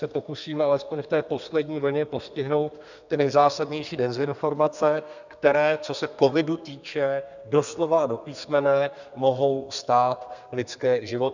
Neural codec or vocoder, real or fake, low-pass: codec, 32 kHz, 1.9 kbps, SNAC; fake; 7.2 kHz